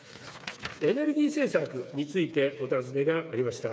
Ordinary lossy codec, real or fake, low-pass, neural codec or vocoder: none; fake; none; codec, 16 kHz, 4 kbps, FreqCodec, smaller model